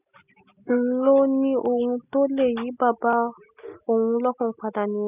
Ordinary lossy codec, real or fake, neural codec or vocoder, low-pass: none; real; none; 3.6 kHz